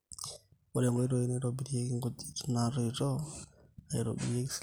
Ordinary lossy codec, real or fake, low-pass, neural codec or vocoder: none; real; none; none